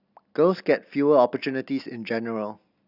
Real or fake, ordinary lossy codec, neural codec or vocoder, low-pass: real; none; none; 5.4 kHz